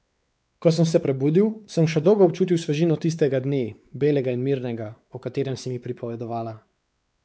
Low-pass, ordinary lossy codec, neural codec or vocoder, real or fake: none; none; codec, 16 kHz, 4 kbps, X-Codec, WavLM features, trained on Multilingual LibriSpeech; fake